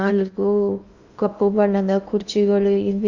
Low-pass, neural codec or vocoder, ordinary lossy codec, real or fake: 7.2 kHz; codec, 16 kHz in and 24 kHz out, 0.8 kbps, FocalCodec, streaming, 65536 codes; Opus, 64 kbps; fake